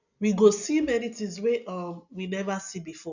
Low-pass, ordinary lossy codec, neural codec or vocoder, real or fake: 7.2 kHz; none; none; real